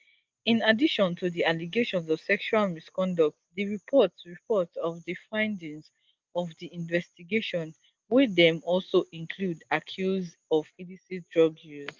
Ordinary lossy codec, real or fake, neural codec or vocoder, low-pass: Opus, 32 kbps; real; none; 7.2 kHz